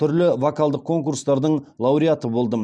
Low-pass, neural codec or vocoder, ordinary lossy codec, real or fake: 9.9 kHz; none; none; real